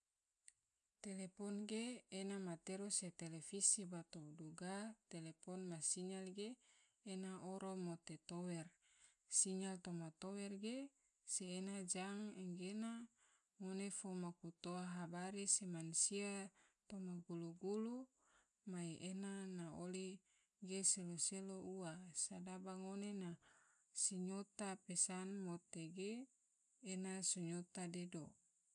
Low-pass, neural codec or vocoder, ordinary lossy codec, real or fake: 9.9 kHz; none; none; real